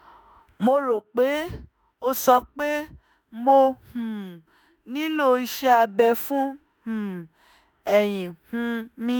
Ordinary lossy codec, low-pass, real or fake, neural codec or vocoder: none; none; fake; autoencoder, 48 kHz, 32 numbers a frame, DAC-VAE, trained on Japanese speech